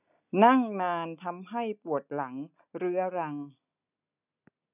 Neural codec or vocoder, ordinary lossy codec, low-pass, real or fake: none; none; 3.6 kHz; real